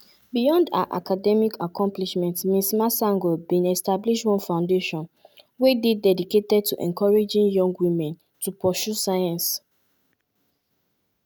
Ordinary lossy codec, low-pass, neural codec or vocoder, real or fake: none; none; none; real